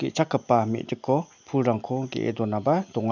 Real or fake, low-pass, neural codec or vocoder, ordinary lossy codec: real; 7.2 kHz; none; none